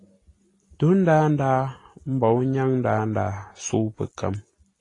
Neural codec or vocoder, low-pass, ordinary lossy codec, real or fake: none; 10.8 kHz; AAC, 32 kbps; real